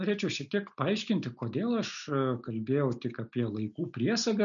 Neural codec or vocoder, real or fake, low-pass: none; real; 7.2 kHz